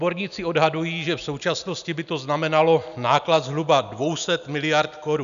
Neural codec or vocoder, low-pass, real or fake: none; 7.2 kHz; real